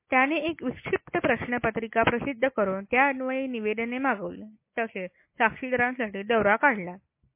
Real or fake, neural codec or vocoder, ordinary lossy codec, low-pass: real; none; MP3, 24 kbps; 3.6 kHz